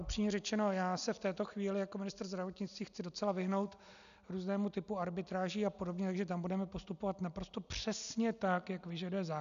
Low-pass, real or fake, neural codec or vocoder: 7.2 kHz; real; none